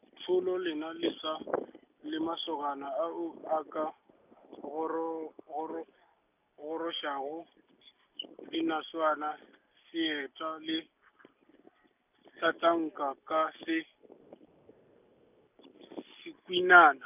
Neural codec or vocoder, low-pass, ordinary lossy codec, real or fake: none; 3.6 kHz; none; real